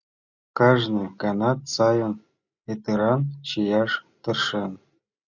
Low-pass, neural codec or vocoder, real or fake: 7.2 kHz; none; real